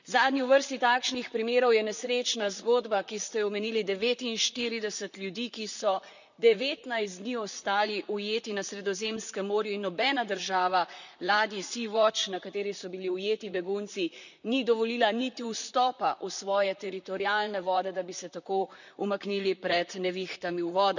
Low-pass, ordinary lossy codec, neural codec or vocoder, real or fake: 7.2 kHz; none; vocoder, 44.1 kHz, 128 mel bands, Pupu-Vocoder; fake